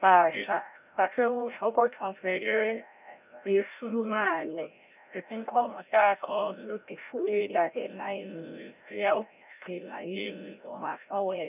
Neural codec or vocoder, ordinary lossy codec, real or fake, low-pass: codec, 16 kHz, 0.5 kbps, FreqCodec, larger model; none; fake; 3.6 kHz